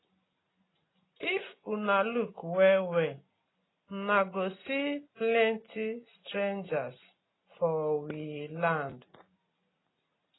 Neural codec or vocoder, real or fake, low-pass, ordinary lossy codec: none; real; 7.2 kHz; AAC, 16 kbps